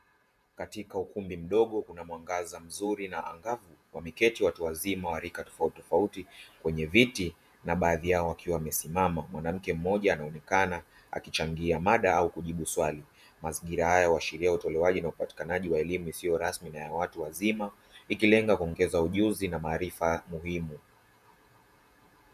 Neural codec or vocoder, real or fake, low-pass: vocoder, 48 kHz, 128 mel bands, Vocos; fake; 14.4 kHz